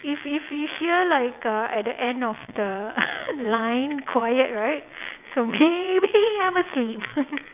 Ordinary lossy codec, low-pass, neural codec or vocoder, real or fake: none; 3.6 kHz; vocoder, 22.05 kHz, 80 mel bands, WaveNeXt; fake